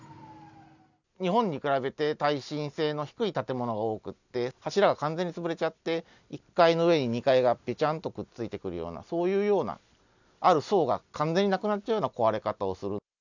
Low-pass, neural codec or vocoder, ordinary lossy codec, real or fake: 7.2 kHz; none; none; real